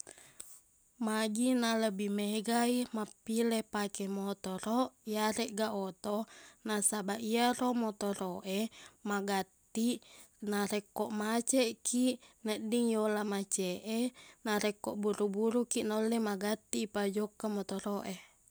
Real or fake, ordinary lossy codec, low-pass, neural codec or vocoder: real; none; none; none